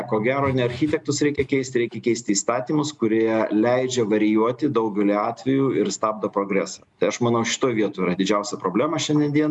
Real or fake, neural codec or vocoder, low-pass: real; none; 10.8 kHz